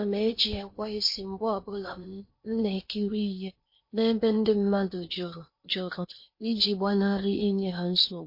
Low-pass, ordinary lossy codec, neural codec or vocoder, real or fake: 5.4 kHz; MP3, 32 kbps; codec, 16 kHz in and 24 kHz out, 0.8 kbps, FocalCodec, streaming, 65536 codes; fake